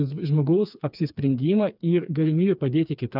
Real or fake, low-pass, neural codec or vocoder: fake; 5.4 kHz; codec, 16 kHz, 4 kbps, FreqCodec, smaller model